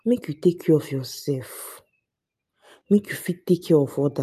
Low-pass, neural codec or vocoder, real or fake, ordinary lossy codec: 14.4 kHz; none; real; MP3, 96 kbps